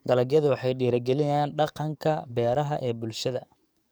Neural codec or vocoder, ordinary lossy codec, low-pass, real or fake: codec, 44.1 kHz, 7.8 kbps, DAC; none; none; fake